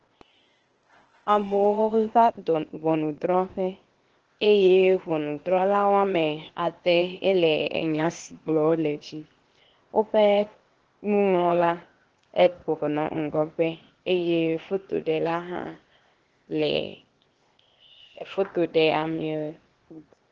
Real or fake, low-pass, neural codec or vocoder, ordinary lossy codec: fake; 7.2 kHz; codec, 16 kHz, 0.8 kbps, ZipCodec; Opus, 16 kbps